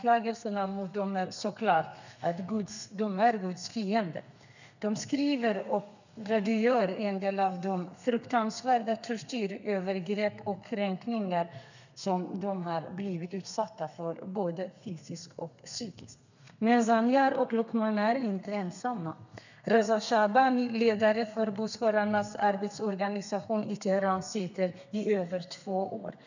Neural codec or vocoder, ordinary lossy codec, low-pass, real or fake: codec, 32 kHz, 1.9 kbps, SNAC; none; 7.2 kHz; fake